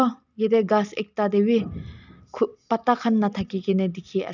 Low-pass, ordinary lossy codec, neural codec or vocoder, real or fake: 7.2 kHz; none; none; real